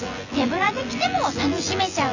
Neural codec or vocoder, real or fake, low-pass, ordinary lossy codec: vocoder, 24 kHz, 100 mel bands, Vocos; fake; 7.2 kHz; Opus, 64 kbps